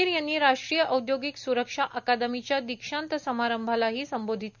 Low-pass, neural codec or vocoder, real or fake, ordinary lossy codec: 7.2 kHz; none; real; none